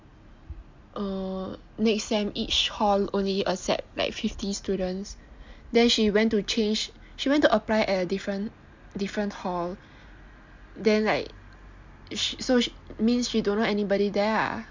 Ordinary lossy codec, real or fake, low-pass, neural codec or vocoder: MP3, 64 kbps; real; 7.2 kHz; none